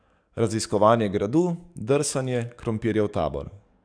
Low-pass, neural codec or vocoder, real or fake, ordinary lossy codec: 9.9 kHz; codec, 44.1 kHz, 7.8 kbps, DAC; fake; none